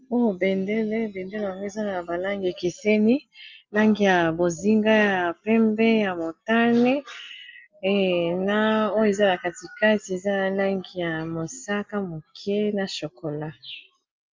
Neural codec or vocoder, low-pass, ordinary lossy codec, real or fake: none; 7.2 kHz; Opus, 32 kbps; real